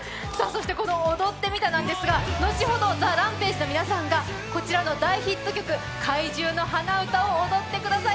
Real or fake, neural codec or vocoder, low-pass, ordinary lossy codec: real; none; none; none